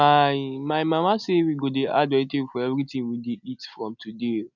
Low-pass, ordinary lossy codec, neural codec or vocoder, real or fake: 7.2 kHz; none; none; real